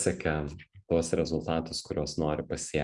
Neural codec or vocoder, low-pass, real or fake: none; 10.8 kHz; real